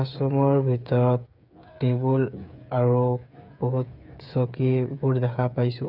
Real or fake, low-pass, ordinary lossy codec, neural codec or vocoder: fake; 5.4 kHz; none; codec, 16 kHz, 8 kbps, FreqCodec, smaller model